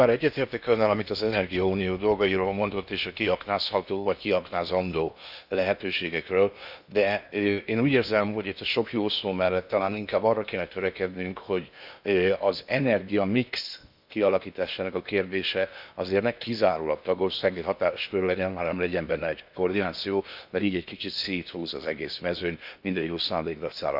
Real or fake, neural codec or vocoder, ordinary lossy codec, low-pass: fake; codec, 16 kHz in and 24 kHz out, 0.8 kbps, FocalCodec, streaming, 65536 codes; none; 5.4 kHz